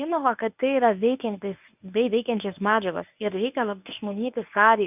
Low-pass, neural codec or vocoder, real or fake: 3.6 kHz; codec, 24 kHz, 0.9 kbps, WavTokenizer, medium speech release version 1; fake